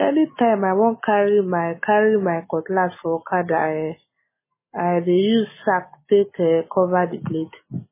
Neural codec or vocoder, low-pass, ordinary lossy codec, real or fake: none; 3.6 kHz; MP3, 16 kbps; real